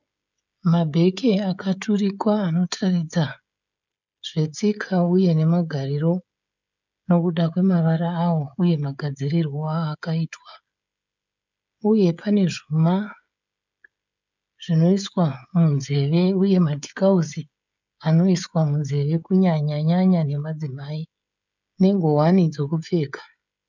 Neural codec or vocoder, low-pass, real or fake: codec, 16 kHz, 16 kbps, FreqCodec, smaller model; 7.2 kHz; fake